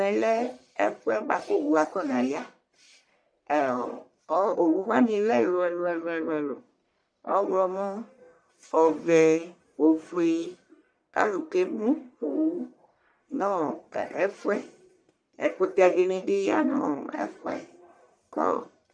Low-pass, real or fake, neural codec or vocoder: 9.9 kHz; fake; codec, 44.1 kHz, 1.7 kbps, Pupu-Codec